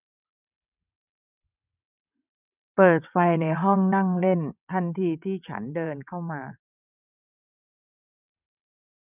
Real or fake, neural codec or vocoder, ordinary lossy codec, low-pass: fake; vocoder, 22.05 kHz, 80 mel bands, WaveNeXt; none; 3.6 kHz